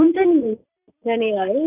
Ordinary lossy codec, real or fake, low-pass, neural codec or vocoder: none; fake; 3.6 kHz; vocoder, 44.1 kHz, 128 mel bands every 512 samples, BigVGAN v2